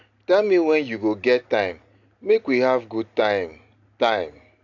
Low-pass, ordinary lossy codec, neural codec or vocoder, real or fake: 7.2 kHz; AAC, 48 kbps; none; real